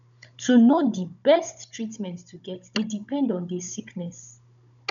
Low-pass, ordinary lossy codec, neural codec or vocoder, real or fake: 7.2 kHz; none; codec, 16 kHz, 16 kbps, FunCodec, trained on Chinese and English, 50 frames a second; fake